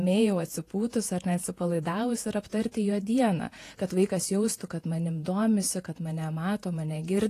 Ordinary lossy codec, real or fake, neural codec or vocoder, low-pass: AAC, 48 kbps; fake; vocoder, 48 kHz, 128 mel bands, Vocos; 14.4 kHz